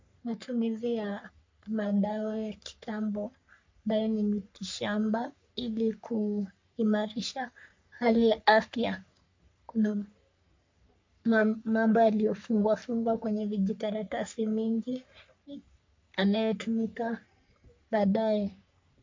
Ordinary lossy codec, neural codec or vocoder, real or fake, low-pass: MP3, 48 kbps; codec, 44.1 kHz, 3.4 kbps, Pupu-Codec; fake; 7.2 kHz